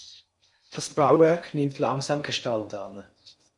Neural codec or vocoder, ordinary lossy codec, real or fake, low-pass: codec, 16 kHz in and 24 kHz out, 0.6 kbps, FocalCodec, streaming, 4096 codes; MP3, 64 kbps; fake; 10.8 kHz